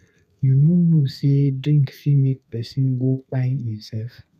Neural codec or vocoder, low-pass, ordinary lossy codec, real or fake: codec, 32 kHz, 1.9 kbps, SNAC; 14.4 kHz; none; fake